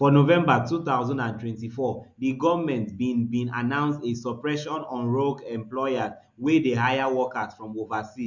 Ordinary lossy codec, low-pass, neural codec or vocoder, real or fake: none; 7.2 kHz; none; real